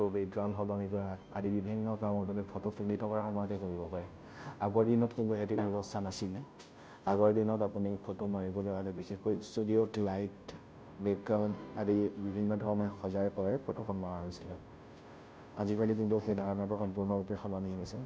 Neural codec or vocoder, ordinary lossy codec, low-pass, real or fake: codec, 16 kHz, 0.5 kbps, FunCodec, trained on Chinese and English, 25 frames a second; none; none; fake